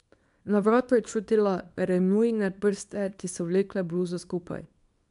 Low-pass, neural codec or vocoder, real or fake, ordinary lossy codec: 10.8 kHz; codec, 24 kHz, 0.9 kbps, WavTokenizer, small release; fake; none